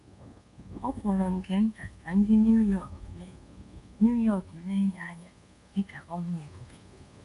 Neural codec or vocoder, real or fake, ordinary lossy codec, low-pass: codec, 24 kHz, 1.2 kbps, DualCodec; fake; none; 10.8 kHz